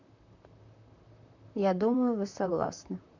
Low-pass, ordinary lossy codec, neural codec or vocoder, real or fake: 7.2 kHz; none; vocoder, 44.1 kHz, 128 mel bands, Pupu-Vocoder; fake